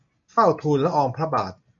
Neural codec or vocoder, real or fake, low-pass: none; real; 7.2 kHz